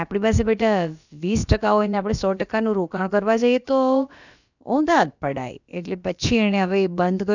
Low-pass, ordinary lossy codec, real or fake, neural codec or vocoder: 7.2 kHz; none; fake; codec, 16 kHz, about 1 kbps, DyCAST, with the encoder's durations